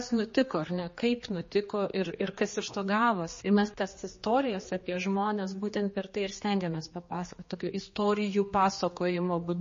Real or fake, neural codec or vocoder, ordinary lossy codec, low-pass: fake; codec, 16 kHz, 2 kbps, X-Codec, HuBERT features, trained on general audio; MP3, 32 kbps; 7.2 kHz